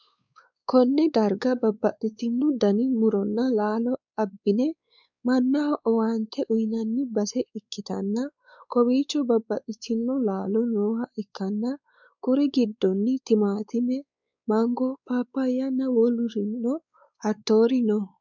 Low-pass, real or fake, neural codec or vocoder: 7.2 kHz; fake; codec, 16 kHz, 4 kbps, X-Codec, WavLM features, trained on Multilingual LibriSpeech